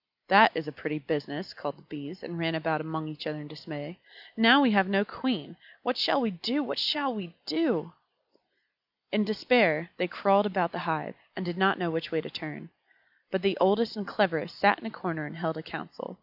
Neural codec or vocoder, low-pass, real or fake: none; 5.4 kHz; real